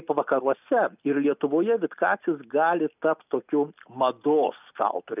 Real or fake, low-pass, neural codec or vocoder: real; 3.6 kHz; none